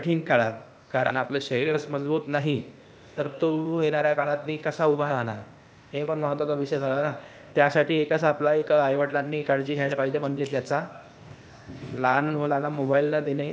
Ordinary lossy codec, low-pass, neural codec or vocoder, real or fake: none; none; codec, 16 kHz, 0.8 kbps, ZipCodec; fake